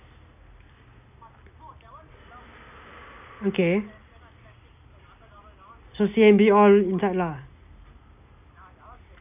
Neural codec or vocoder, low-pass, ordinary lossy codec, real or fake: none; 3.6 kHz; none; real